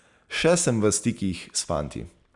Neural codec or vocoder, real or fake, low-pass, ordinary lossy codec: none; real; 10.8 kHz; none